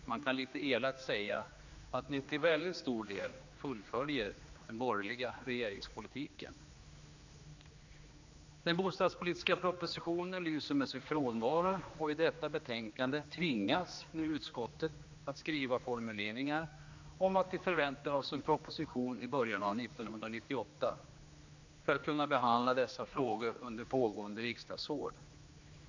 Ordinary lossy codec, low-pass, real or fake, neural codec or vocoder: AAC, 48 kbps; 7.2 kHz; fake; codec, 16 kHz, 2 kbps, X-Codec, HuBERT features, trained on general audio